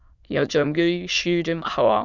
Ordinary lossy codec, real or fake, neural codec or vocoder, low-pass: Opus, 64 kbps; fake; autoencoder, 22.05 kHz, a latent of 192 numbers a frame, VITS, trained on many speakers; 7.2 kHz